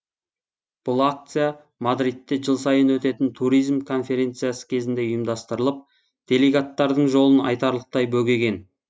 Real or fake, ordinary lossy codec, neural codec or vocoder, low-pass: real; none; none; none